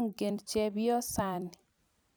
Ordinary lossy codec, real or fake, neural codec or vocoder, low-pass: none; fake; vocoder, 44.1 kHz, 128 mel bands every 256 samples, BigVGAN v2; none